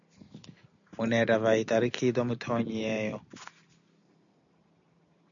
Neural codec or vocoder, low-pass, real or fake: none; 7.2 kHz; real